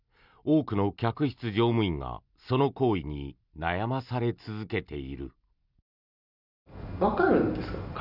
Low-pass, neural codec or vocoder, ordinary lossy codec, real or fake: 5.4 kHz; none; none; real